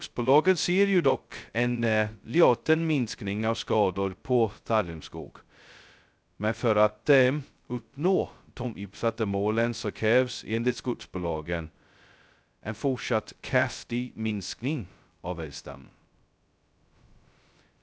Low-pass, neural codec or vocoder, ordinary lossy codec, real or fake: none; codec, 16 kHz, 0.2 kbps, FocalCodec; none; fake